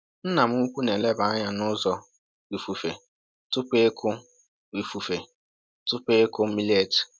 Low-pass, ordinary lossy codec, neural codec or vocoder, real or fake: none; none; none; real